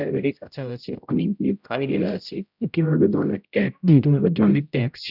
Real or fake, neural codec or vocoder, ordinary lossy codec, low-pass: fake; codec, 16 kHz, 0.5 kbps, X-Codec, HuBERT features, trained on general audio; none; 5.4 kHz